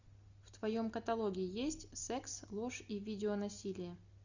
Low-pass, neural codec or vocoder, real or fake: 7.2 kHz; none; real